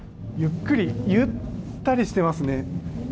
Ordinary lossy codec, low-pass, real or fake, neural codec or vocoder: none; none; real; none